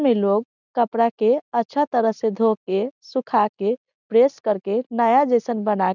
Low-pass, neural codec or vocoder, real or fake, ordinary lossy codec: 7.2 kHz; none; real; none